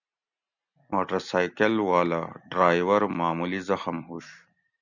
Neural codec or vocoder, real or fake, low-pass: none; real; 7.2 kHz